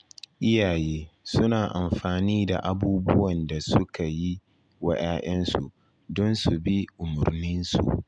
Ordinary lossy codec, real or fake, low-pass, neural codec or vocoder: none; real; 9.9 kHz; none